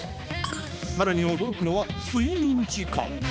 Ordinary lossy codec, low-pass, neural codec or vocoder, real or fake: none; none; codec, 16 kHz, 2 kbps, X-Codec, HuBERT features, trained on balanced general audio; fake